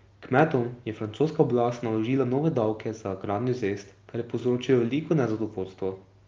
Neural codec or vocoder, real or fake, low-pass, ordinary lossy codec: none; real; 7.2 kHz; Opus, 32 kbps